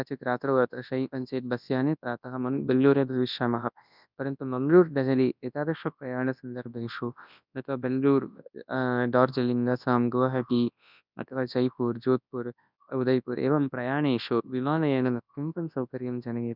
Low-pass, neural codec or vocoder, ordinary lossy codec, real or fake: 5.4 kHz; codec, 24 kHz, 0.9 kbps, WavTokenizer, large speech release; none; fake